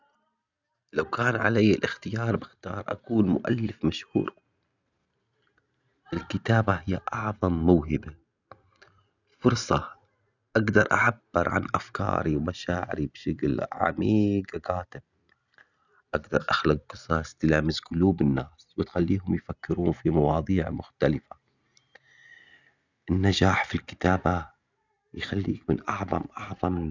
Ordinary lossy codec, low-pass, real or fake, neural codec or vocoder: none; 7.2 kHz; real; none